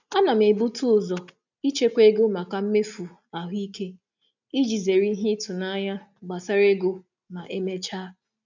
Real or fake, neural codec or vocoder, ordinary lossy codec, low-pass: real; none; none; 7.2 kHz